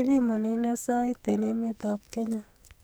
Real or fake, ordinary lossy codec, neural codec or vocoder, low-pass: fake; none; codec, 44.1 kHz, 2.6 kbps, SNAC; none